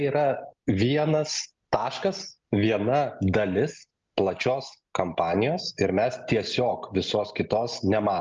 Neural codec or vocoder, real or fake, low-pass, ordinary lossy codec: none; real; 7.2 kHz; Opus, 24 kbps